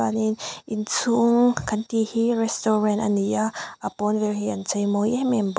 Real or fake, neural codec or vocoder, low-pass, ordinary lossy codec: real; none; none; none